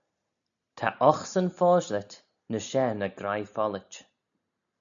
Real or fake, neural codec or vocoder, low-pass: real; none; 7.2 kHz